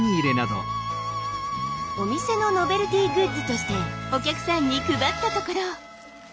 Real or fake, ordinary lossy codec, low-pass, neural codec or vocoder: real; none; none; none